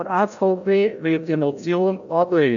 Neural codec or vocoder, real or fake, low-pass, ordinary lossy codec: codec, 16 kHz, 0.5 kbps, FreqCodec, larger model; fake; 7.2 kHz; none